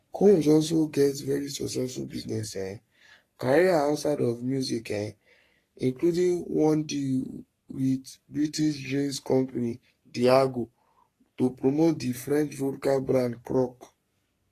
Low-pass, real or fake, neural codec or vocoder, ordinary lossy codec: 14.4 kHz; fake; codec, 44.1 kHz, 3.4 kbps, Pupu-Codec; AAC, 48 kbps